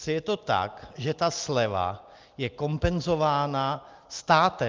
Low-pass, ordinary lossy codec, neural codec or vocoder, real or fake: 7.2 kHz; Opus, 24 kbps; none; real